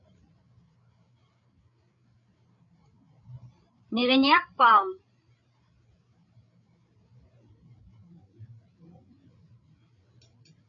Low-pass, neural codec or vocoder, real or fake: 7.2 kHz; codec, 16 kHz, 8 kbps, FreqCodec, larger model; fake